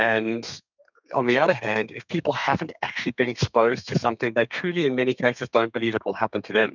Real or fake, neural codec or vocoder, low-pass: fake; codec, 32 kHz, 1.9 kbps, SNAC; 7.2 kHz